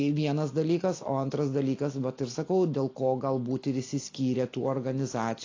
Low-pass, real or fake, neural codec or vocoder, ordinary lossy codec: 7.2 kHz; real; none; AAC, 32 kbps